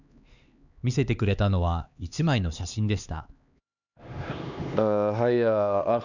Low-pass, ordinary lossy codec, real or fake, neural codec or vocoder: 7.2 kHz; none; fake; codec, 16 kHz, 2 kbps, X-Codec, HuBERT features, trained on LibriSpeech